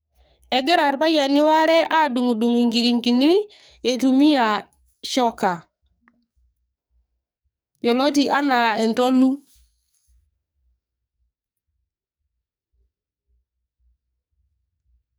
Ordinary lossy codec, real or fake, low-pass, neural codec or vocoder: none; fake; none; codec, 44.1 kHz, 2.6 kbps, SNAC